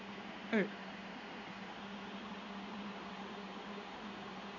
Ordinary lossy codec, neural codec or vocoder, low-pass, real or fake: none; codec, 16 kHz in and 24 kHz out, 1 kbps, XY-Tokenizer; 7.2 kHz; fake